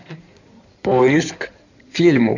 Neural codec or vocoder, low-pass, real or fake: codec, 16 kHz in and 24 kHz out, 2.2 kbps, FireRedTTS-2 codec; 7.2 kHz; fake